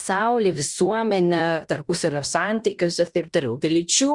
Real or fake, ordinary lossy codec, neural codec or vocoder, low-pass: fake; Opus, 64 kbps; codec, 16 kHz in and 24 kHz out, 0.9 kbps, LongCat-Audio-Codec, fine tuned four codebook decoder; 10.8 kHz